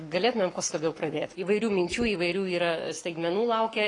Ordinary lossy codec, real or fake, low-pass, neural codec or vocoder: AAC, 32 kbps; fake; 10.8 kHz; codec, 44.1 kHz, 7.8 kbps, Pupu-Codec